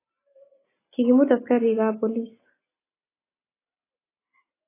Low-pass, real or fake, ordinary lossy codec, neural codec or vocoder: 3.6 kHz; real; AAC, 16 kbps; none